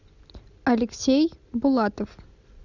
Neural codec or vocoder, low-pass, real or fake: none; 7.2 kHz; real